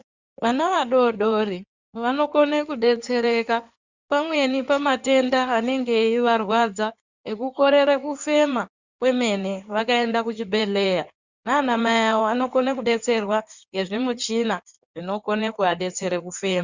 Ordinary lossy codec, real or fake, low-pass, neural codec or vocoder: Opus, 64 kbps; fake; 7.2 kHz; codec, 16 kHz in and 24 kHz out, 2.2 kbps, FireRedTTS-2 codec